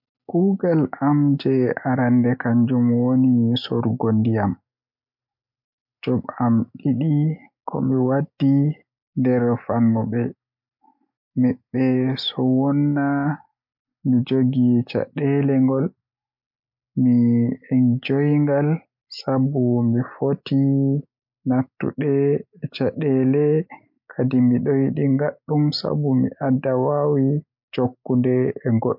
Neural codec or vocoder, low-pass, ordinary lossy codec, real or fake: none; 5.4 kHz; MP3, 48 kbps; real